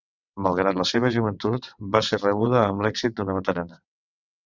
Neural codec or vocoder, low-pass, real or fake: vocoder, 22.05 kHz, 80 mel bands, WaveNeXt; 7.2 kHz; fake